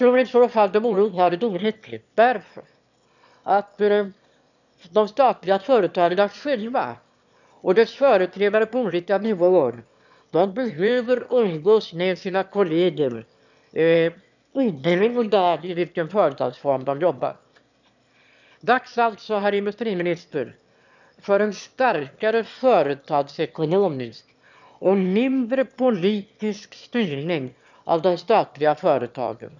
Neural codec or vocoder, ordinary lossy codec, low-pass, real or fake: autoencoder, 22.05 kHz, a latent of 192 numbers a frame, VITS, trained on one speaker; none; 7.2 kHz; fake